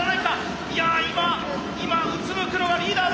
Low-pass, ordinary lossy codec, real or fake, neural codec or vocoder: none; none; real; none